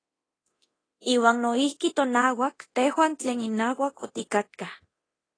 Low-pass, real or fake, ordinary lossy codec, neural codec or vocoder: 9.9 kHz; fake; AAC, 32 kbps; codec, 24 kHz, 0.9 kbps, DualCodec